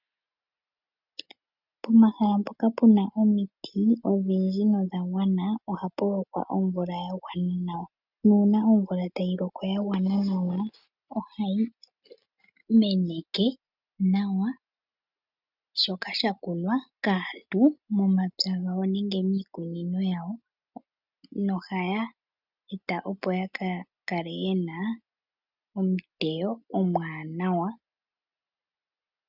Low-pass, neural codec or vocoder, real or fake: 5.4 kHz; none; real